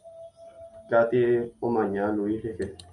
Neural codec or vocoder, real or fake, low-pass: none; real; 10.8 kHz